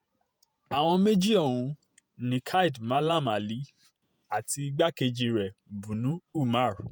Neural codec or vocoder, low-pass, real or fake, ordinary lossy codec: vocoder, 48 kHz, 128 mel bands, Vocos; none; fake; none